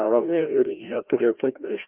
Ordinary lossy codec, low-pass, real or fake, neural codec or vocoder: Opus, 24 kbps; 3.6 kHz; fake; codec, 16 kHz, 1 kbps, FreqCodec, larger model